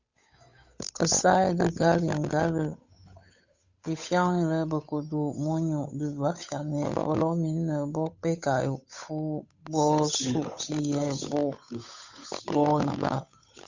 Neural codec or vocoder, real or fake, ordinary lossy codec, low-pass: codec, 16 kHz, 8 kbps, FunCodec, trained on Chinese and English, 25 frames a second; fake; Opus, 64 kbps; 7.2 kHz